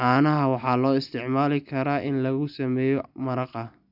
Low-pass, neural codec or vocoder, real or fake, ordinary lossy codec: 5.4 kHz; none; real; none